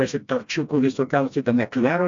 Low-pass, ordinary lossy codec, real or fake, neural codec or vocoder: 7.2 kHz; MP3, 64 kbps; fake; codec, 16 kHz, 1 kbps, FreqCodec, smaller model